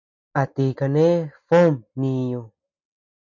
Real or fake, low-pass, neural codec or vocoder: real; 7.2 kHz; none